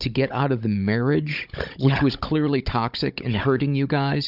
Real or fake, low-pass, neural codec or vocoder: fake; 5.4 kHz; codec, 16 kHz, 16 kbps, FunCodec, trained on Chinese and English, 50 frames a second